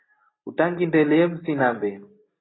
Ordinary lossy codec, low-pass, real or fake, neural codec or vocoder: AAC, 16 kbps; 7.2 kHz; real; none